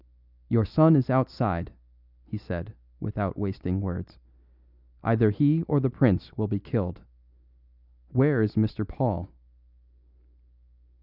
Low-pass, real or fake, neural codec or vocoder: 5.4 kHz; real; none